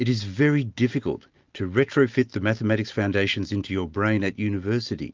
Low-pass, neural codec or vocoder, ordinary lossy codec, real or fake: 7.2 kHz; none; Opus, 24 kbps; real